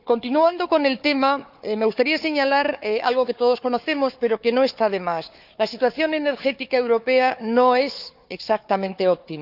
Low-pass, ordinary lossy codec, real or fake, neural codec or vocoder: 5.4 kHz; none; fake; codec, 16 kHz, 4 kbps, FunCodec, trained on Chinese and English, 50 frames a second